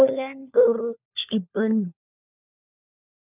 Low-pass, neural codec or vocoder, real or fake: 3.6 kHz; codec, 16 kHz, 4 kbps, FunCodec, trained on LibriTTS, 50 frames a second; fake